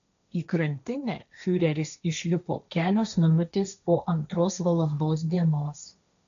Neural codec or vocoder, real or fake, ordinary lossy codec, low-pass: codec, 16 kHz, 1.1 kbps, Voila-Tokenizer; fake; AAC, 96 kbps; 7.2 kHz